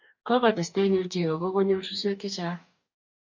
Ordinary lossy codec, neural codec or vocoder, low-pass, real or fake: MP3, 48 kbps; codec, 44.1 kHz, 2.6 kbps, DAC; 7.2 kHz; fake